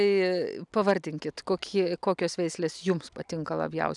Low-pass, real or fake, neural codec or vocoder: 10.8 kHz; real; none